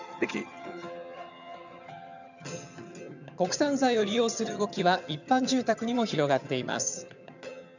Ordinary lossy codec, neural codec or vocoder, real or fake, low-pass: none; vocoder, 22.05 kHz, 80 mel bands, HiFi-GAN; fake; 7.2 kHz